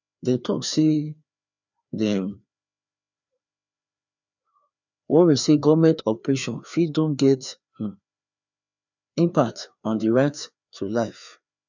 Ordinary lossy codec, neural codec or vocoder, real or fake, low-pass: none; codec, 16 kHz, 2 kbps, FreqCodec, larger model; fake; 7.2 kHz